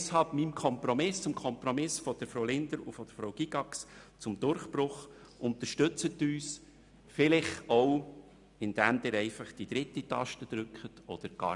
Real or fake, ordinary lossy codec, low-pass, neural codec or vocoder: real; none; 10.8 kHz; none